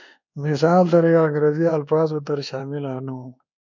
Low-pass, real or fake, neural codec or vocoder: 7.2 kHz; fake; codec, 24 kHz, 1.2 kbps, DualCodec